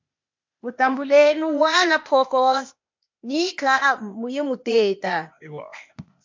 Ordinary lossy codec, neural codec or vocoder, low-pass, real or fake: MP3, 48 kbps; codec, 16 kHz, 0.8 kbps, ZipCodec; 7.2 kHz; fake